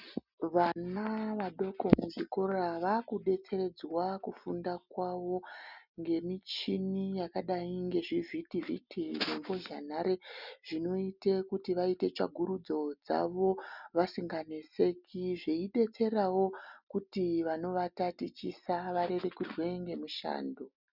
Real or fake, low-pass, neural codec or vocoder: real; 5.4 kHz; none